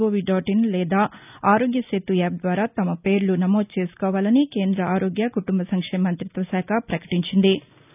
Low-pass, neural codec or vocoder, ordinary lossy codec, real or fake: 3.6 kHz; none; none; real